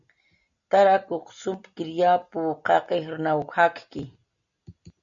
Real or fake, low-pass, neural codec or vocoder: real; 7.2 kHz; none